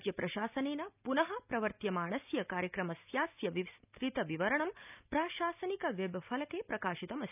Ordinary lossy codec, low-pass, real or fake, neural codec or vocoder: none; 3.6 kHz; real; none